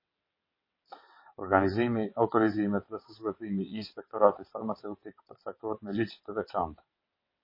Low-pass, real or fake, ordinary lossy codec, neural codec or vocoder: 5.4 kHz; fake; MP3, 24 kbps; vocoder, 22.05 kHz, 80 mel bands, WaveNeXt